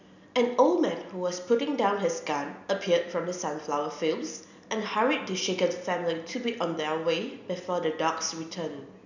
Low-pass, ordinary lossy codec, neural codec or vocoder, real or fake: 7.2 kHz; none; none; real